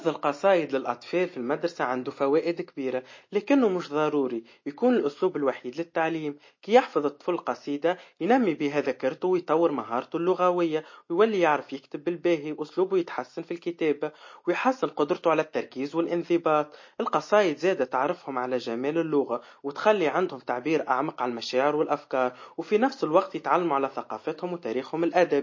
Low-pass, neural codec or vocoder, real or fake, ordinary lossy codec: 7.2 kHz; none; real; MP3, 32 kbps